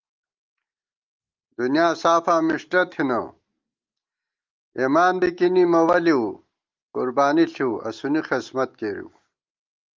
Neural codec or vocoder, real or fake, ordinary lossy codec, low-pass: none; real; Opus, 32 kbps; 7.2 kHz